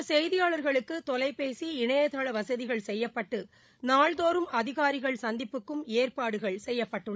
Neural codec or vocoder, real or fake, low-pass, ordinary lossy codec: codec, 16 kHz, 16 kbps, FreqCodec, larger model; fake; none; none